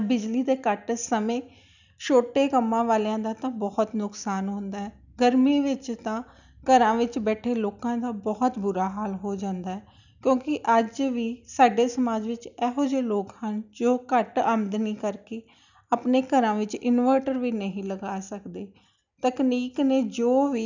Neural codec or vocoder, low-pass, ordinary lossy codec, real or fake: none; 7.2 kHz; none; real